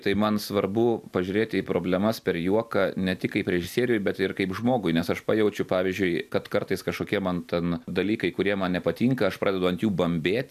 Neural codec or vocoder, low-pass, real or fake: none; 14.4 kHz; real